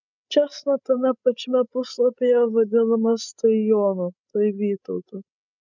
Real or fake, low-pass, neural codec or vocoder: fake; 7.2 kHz; codec, 16 kHz, 16 kbps, FreqCodec, larger model